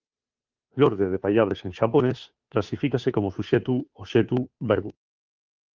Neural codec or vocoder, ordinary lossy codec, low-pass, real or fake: codec, 16 kHz, 2 kbps, FunCodec, trained on Chinese and English, 25 frames a second; Opus, 24 kbps; 7.2 kHz; fake